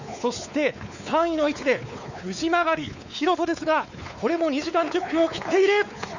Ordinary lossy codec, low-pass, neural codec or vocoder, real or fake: none; 7.2 kHz; codec, 16 kHz, 4 kbps, X-Codec, WavLM features, trained on Multilingual LibriSpeech; fake